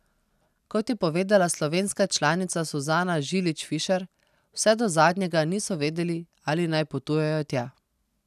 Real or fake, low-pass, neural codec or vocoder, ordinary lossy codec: real; 14.4 kHz; none; none